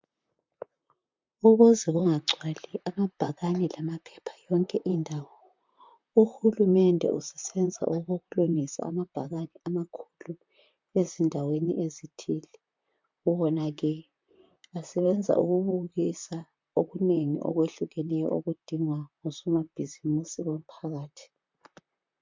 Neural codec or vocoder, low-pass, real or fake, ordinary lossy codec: vocoder, 44.1 kHz, 128 mel bands, Pupu-Vocoder; 7.2 kHz; fake; MP3, 64 kbps